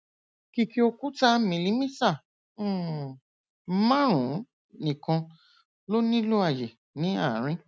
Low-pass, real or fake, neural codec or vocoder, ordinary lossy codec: none; real; none; none